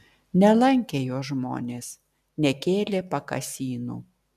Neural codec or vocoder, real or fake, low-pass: none; real; 14.4 kHz